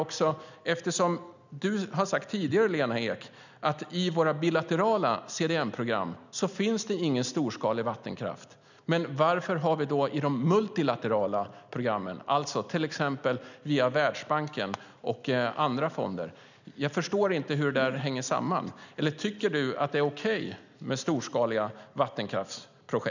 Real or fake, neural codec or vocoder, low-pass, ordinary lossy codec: real; none; 7.2 kHz; none